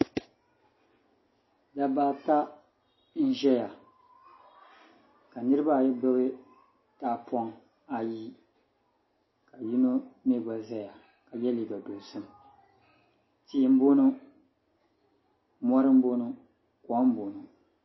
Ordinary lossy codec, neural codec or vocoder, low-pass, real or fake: MP3, 24 kbps; none; 7.2 kHz; real